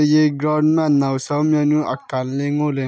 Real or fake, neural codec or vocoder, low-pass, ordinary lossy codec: real; none; none; none